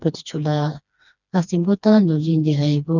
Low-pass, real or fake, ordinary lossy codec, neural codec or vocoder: 7.2 kHz; fake; none; codec, 16 kHz, 2 kbps, FreqCodec, smaller model